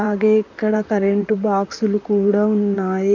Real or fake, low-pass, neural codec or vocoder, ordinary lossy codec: fake; 7.2 kHz; vocoder, 44.1 kHz, 128 mel bands, Pupu-Vocoder; none